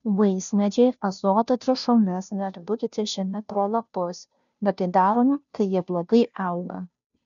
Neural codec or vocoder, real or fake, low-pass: codec, 16 kHz, 0.5 kbps, FunCodec, trained on Chinese and English, 25 frames a second; fake; 7.2 kHz